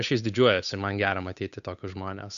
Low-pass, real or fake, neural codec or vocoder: 7.2 kHz; fake; codec, 16 kHz, 8 kbps, FunCodec, trained on Chinese and English, 25 frames a second